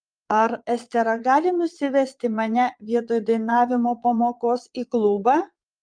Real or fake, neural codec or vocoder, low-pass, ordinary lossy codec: fake; vocoder, 22.05 kHz, 80 mel bands, Vocos; 9.9 kHz; Opus, 32 kbps